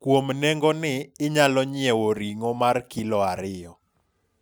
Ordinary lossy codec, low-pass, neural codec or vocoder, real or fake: none; none; none; real